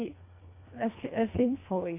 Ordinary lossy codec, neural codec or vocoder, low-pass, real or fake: AAC, 24 kbps; codec, 24 kHz, 1.5 kbps, HILCodec; 3.6 kHz; fake